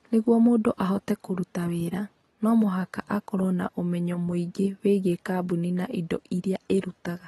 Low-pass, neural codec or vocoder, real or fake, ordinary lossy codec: 19.8 kHz; none; real; AAC, 32 kbps